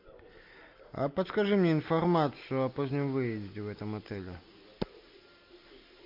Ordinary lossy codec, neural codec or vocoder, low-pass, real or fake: MP3, 48 kbps; none; 5.4 kHz; real